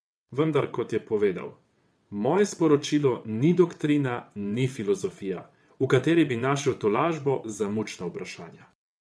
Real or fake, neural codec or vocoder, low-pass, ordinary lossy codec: fake; vocoder, 22.05 kHz, 80 mel bands, WaveNeXt; none; none